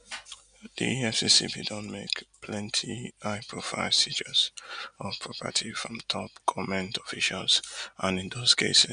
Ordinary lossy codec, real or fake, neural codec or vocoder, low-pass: AAC, 96 kbps; real; none; 9.9 kHz